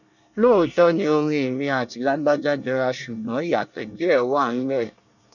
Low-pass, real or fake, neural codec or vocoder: 7.2 kHz; fake; codec, 24 kHz, 1 kbps, SNAC